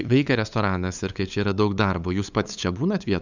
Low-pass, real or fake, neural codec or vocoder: 7.2 kHz; fake; codec, 16 kHz, 8 kbps, FunCodec, trained on LibriTTS, 25 frames a second